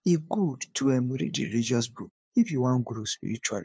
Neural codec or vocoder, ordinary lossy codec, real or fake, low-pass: codec, 16 kHz, 2 kbps, FunCodec, trained on LibriTTS, 25 frames a second; none; fake; none